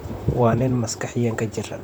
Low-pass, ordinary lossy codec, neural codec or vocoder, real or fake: none; none; vocoder, 44.1 kHz, 128 mel bands, Pupu-Vocoder; fake